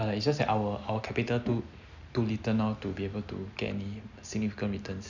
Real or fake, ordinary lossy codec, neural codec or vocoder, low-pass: real; none; none; 7.2 kHz